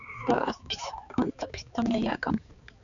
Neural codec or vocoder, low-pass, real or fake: codec, 16 kHz, 4 kbps, X-Codec, HuBERT features, trained on balanced general audio; 7.2 kHz; fake